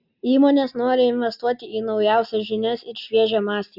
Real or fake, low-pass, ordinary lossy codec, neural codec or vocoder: real; 5.4 kHz; Opus, 64 kbps; none